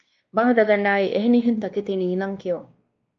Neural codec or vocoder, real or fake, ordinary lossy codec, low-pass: codec, 16 kHz, 2 kbps, X-Codec, WavLM features, trained on Multilingual LibriSpeech; fake; Opus, 24 kbps; 7.2 kHz